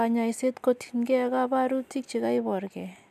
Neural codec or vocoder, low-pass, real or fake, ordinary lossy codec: none; 14.4 kHz; real; none